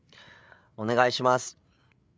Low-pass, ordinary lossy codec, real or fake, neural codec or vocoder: none; none; fake; codec, 16 kHz, 8 kbps, FreqCodec, larger model